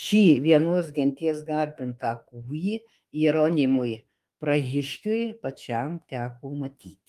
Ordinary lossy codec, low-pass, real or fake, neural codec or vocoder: Opus, 32 kbps; 14.4 kHz; fake; autoencoder, 48 kHz, 32 numbers a frame, DAC-VAE, trained on Japanese speech